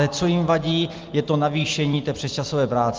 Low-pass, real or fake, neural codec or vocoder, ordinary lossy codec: 7.2 kHz; real; none; Opus, 32 kbps